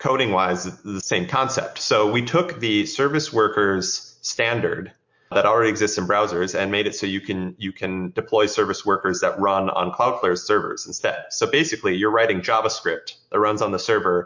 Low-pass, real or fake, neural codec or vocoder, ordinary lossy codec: 7.2 kHz; real; none; MP3, 48 kbps